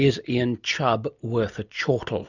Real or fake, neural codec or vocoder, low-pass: real; none; 7.2 kHz